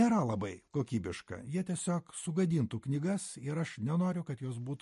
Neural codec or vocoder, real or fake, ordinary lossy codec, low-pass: none; real; MP3, 48 kbps; 14.4 kHz